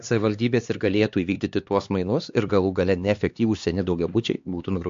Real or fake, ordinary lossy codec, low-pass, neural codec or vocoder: fake; MP3, 48 kbps; 7.2 kHz; codec, 16 kHz, 2 kbps, X-Codec, HuBERT features, trained on LibriSpeech